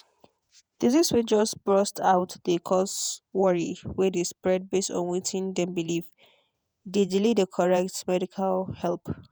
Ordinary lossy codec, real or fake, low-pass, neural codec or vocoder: none; fake; none; vocoder, 48 kHz, 128 mel bands, Vocos